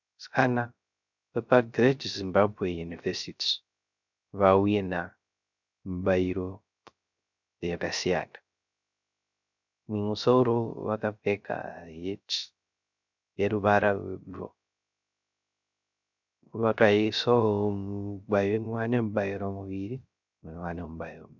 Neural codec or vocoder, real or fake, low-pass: codec, 16 kHz, 0.3 kbps, FocalCodec; fake; 7.2 kHz